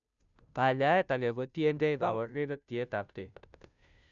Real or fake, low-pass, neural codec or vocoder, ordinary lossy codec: fake; 7.2 kHz; codec, 16 kHz, 0.5 kbps, FunCodec, trained on Chinese and English, 25 frames a second; none